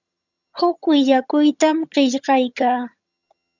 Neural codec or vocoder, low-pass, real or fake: vocoder, 22.05 kHz, 80 mel bands, HiFi-GAN; 7.2 kHz; fake